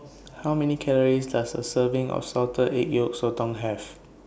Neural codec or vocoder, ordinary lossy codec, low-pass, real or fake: none; none; none; real